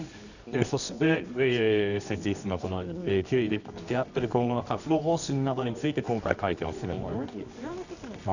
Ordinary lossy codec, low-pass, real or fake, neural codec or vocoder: none; 7.2 kHz; fake; codec, 24 kHz, 0.9 kbps, WavTokenizer, medium music audio release